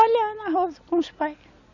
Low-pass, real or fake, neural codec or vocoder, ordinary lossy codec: 7.2 kHz; real; none; Opus, 64 kbps